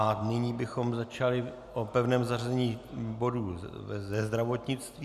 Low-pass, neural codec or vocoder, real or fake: 14.4 kHz; none; real